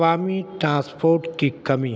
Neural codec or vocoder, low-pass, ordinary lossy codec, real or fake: none; none; none; real